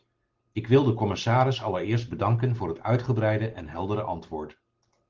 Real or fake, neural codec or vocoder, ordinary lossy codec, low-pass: real; none; Opus, 16 kbps; 7.2 kHz